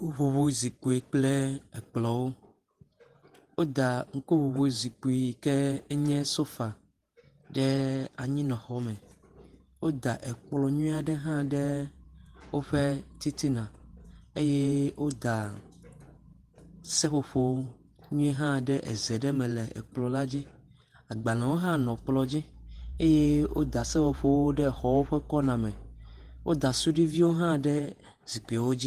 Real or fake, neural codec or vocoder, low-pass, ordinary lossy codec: fake; vocoder, 48 kHz, 128 mel bands, Vocos; 14.4 kHz; Opus, 24 kbps